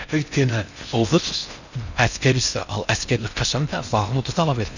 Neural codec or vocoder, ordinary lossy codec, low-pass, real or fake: codec, 16 kHz in and 24 kHz out, 0.6 kbps, FocalCodec, streaming, 4096 codes; none; 7.2 kHz; fake